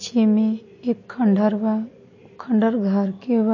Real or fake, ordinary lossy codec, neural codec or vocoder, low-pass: real; MP3, 32 kbps; none; 7.2 kHz